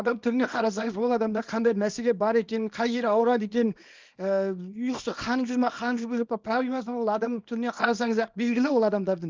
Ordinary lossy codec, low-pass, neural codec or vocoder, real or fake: Opus, 24 kbps; 7.2 kHz; codec, 24 kHz, 0.9 kbps, WavTokenizer, small release; fake